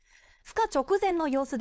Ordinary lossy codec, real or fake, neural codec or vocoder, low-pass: none; fake; codec, 16 kHz, 4.8 kbps, FACodec; none